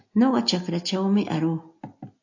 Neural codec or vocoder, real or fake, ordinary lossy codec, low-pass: none; real; AAC, 48 kbps; 7.2 kHz